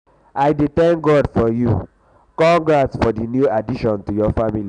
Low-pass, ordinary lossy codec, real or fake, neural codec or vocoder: 9.9 kHz; none; real; none